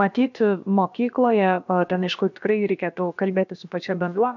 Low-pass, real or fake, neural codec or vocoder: 7.2 kHz; fake; codec, 16 kHz, about 1 kbps, DyCAST, with the encoder's durations